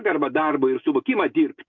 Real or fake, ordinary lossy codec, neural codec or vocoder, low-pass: real; MP3, 48 kbps; none; 7.2 kHz